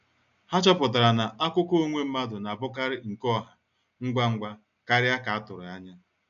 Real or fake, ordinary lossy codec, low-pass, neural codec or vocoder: real; none; 7.2 kHz; none